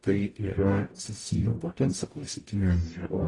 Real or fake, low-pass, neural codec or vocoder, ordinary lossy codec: fake; 10.8 kHz; codec, 44.1 kHz, 0.9 kbps, DAC; AAC, 32 kbps